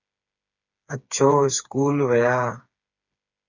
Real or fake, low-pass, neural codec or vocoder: fake; 7.2 kHz; codec, 16 kHz, 4 kbps, FreqCodec, smaller model